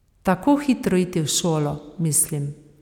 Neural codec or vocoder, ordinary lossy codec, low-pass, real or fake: none; none; 19.8 kHz; real